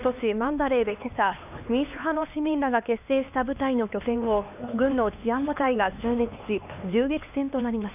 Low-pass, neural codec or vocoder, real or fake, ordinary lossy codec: 3.6 kHz; codec, 16 kHz, 2 kbps, X-Codec, HuBERT features, trained on LibriSpeech; fake; none